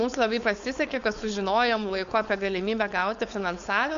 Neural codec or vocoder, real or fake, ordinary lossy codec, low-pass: codec, 16 kHz, 4.8 kbps, FACodec; fake; Opus, 64 kbps; 7.2 kHz